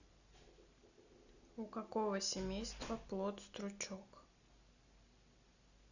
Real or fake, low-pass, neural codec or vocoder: real; 7.2 kHz; none